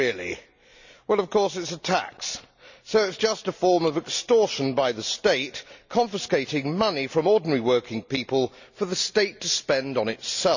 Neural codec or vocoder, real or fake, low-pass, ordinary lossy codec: none; real; 7.2 kHz; none